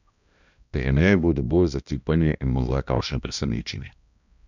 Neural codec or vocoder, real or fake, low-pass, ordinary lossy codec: codec, 16 kHz, 1 kbps, X-Codec, HuBERT features, trained on balanced general audio; fake; 7.2 kHz; none